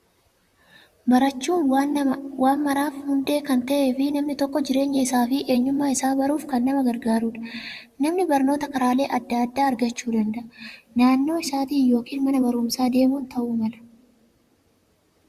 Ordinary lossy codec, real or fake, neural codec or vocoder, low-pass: Opus, 64 kbps; fake; vocoder, 44.1 kHz, 128 mel bands, Pupu-Vocoder; 14.4 kHz